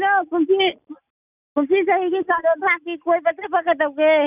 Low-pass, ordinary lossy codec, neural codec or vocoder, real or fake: 3.6 kHz; none; none; real